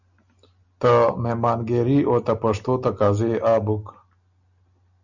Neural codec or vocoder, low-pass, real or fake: none; 7.2 kHz; real